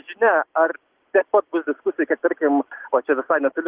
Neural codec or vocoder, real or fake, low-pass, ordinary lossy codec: none; real; 3.6 kHz; Opus, 16 kbps